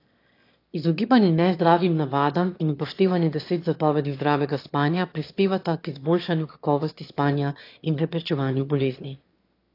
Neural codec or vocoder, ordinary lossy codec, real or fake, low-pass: autoencoder, 22.05 kHz, a latent of 192 numbers a frame, VITS, trained on one speaker; AAC, 32 kbps; fake; 5.4 kHz